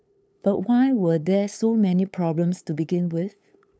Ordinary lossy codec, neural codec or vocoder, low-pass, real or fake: none; codec, 16 kHz, 8 kbps, FunCodec, trained on LibriTTS, 25 frames a second; none; fake